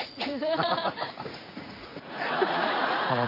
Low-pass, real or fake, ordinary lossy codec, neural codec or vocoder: 5.4 kHz; fake; AAC, 32 kbps; vocoder, 44.1 kHz, 80 mel bands, Vocos